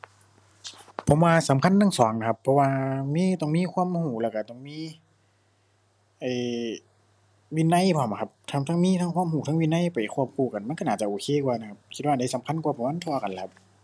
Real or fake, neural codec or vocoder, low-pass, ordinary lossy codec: real; none; none; none